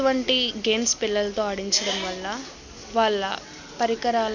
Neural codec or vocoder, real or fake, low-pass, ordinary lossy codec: none; real; 7.2 kHz; none